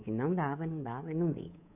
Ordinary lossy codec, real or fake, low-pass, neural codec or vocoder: none; fake; 3.6 kHz; codec, 24 kHz, 3.1 kbps, DualCodec